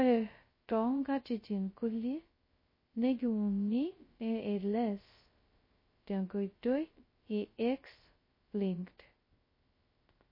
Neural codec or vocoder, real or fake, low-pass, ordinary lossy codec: codec, 16 kHz, 0.2 kbps, FocalCodec; fake; 5.4 kHz; MP3, 24 kbps